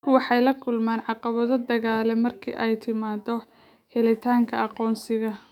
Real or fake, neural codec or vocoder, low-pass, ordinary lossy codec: fake; autoencoder, 48 kHz, 128 numbers a frame, DAC-VAE, trained on Japanese speech; 19.8 kHz; none